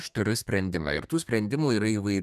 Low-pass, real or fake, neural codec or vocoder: 14.4 kHz; fake; codec, 32 kHz, 1.9 kbps, SNAC